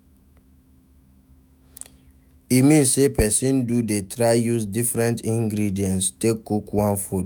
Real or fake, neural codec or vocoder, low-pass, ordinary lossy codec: fake; autoencoder, 48 kHz, 128 numbers a frame, DAC-VAE, trained on Japanese speech; none; none